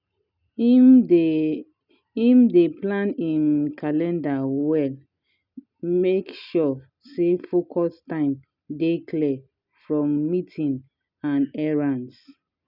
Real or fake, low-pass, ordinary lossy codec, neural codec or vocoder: real; 5.4 kHz; none; none